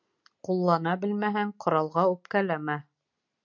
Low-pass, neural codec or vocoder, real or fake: 7.2 kHz; none; real